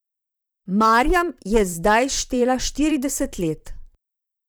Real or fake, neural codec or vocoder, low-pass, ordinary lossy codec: fake; vocoder, 44.1 kHz, 128 mel bands, Pupu-Vocoder; none; none